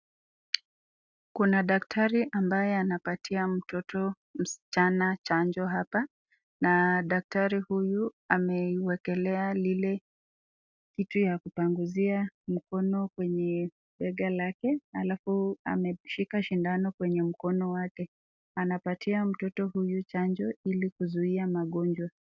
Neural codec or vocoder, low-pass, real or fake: none; 7.2 kHz; real